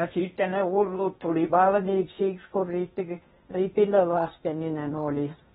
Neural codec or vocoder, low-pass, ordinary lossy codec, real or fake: codec, 16 kHz in and 24 kHz out, 0.8 kbps, FocalCodec, streaming, 65536 codes; 10.8 kHz; AAC, 16 kbps; fake